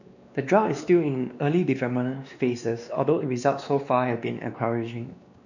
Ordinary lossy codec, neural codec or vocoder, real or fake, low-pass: none; codec, 16 kHz, 2 kbps, X-Codec, WavLM features, trained on Multilingual LibriSpeech; fake; 7.2 kHz